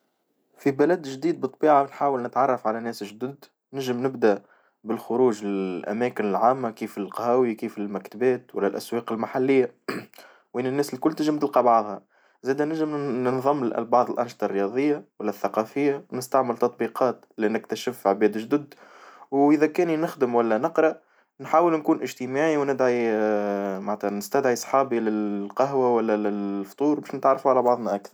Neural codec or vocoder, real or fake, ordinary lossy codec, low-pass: none; real; none; none